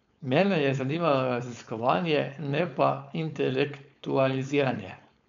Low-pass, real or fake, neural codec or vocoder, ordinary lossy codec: 7.2 kHz; fake; codec, 16 kHz, 4.8 kbps, FACodec; MP3, 64 kbps